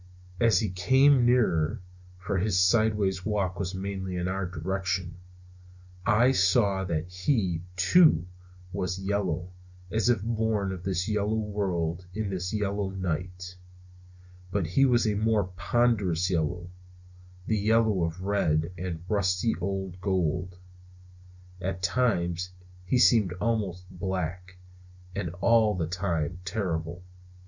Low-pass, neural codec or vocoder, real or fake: 7.2 kHz; none; real